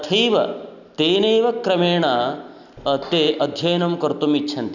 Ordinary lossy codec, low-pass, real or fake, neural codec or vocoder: none; 7.2 kHz; real; none